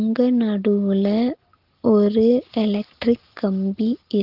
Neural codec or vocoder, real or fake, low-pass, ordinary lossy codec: none; real; 5.4 kHz; Opus, 16 kbps